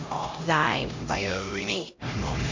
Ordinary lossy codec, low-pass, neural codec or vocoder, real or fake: MP3, 48 kbps; 7.2 kHz; codec, 16 kHz, 0.5 kbps, X-Codec, HuBERT features, trained on LibriSpeech; fake